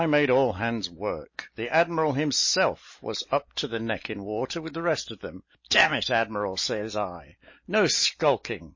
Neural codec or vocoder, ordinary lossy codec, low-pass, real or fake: none; MP3, 32 kbps; 7.2 kHz; real